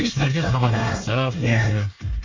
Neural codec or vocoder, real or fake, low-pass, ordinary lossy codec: codec, 24 kHz, 1 kbps, SNAC; fake; 7.2 kHz; MP3, 48 kbps